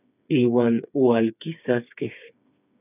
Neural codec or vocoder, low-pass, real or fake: codec, 16 kHz, 4 kbps, FreqCodec, smaller model; 3.6 kHz; fake